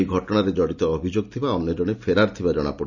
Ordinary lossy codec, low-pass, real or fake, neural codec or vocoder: none; 7.2 kHz; real; none